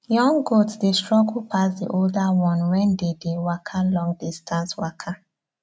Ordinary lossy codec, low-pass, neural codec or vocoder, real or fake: none; none; none; real